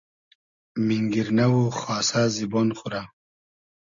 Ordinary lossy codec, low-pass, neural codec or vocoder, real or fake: Opus, 64 kbps; 7.2 kHz; none; real